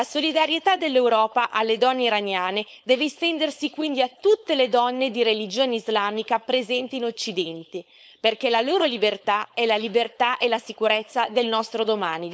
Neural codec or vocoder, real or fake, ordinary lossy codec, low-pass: codec, 16 kHz, 4.8 kbps, FACodec; fake; none; none